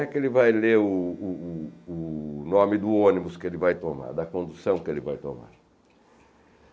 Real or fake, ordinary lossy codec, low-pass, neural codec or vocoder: real; none; none; none